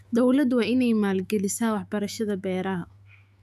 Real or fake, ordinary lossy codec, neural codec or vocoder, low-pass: fake; none; autoencoder, 48 kHz, 128 numbers a frame, DAC-VAE, trained on Japanese speech; 14.4 kHz